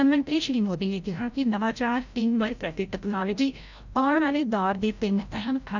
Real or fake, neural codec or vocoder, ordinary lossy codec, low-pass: fake; codec, 16 kHz, 0.5 kbps, FreqCodec, larger model; none; 7.2 kHz